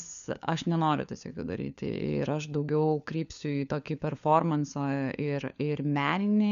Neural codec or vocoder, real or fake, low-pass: codec, 16 kHz, 4 kbps, FunCodec, trained on LibriTTS, 50 frames a second; fake; 7.2 kHz